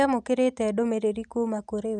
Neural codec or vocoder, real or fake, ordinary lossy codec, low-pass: none; real; none; 10.8 kHz